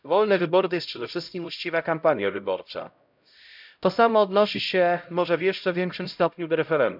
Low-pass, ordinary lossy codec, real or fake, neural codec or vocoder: 5.4 kHz; none; fake; codec, 16 kHz, 0.5 kbps, X-Codec, HuBERT features, trained on LibriSpeech